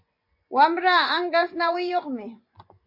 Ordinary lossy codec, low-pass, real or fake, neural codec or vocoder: MP3, 32 kbps; 5.4 kHz; fake; vocoder, 24 kHz, 100 mel bands, Vocos